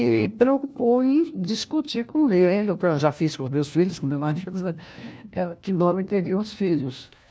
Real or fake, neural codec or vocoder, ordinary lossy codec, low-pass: fake; codec, 16 kHz, 1 kbps, FunCodec, trained on LibriTTS, 50 frames a second; none; none